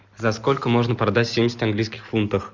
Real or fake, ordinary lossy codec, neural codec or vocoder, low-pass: real; Opus, 64 kbps; none; 7.2 kHz